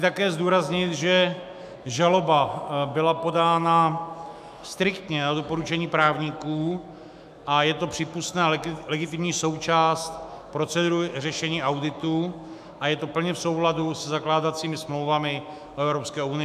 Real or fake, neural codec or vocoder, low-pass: fake; autoencoder, 48 kHz, 128 numbers a frame, DAC-VAE, trained on Japanese speech; 14.4 kHz